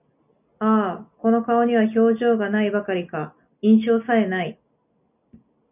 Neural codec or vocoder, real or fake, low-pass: none; real; 3.6 kHz